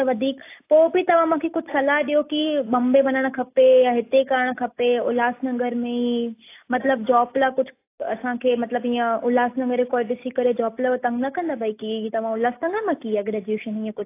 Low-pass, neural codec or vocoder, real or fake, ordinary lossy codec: 3.6 kHz; none; real; AAC, 24 kbps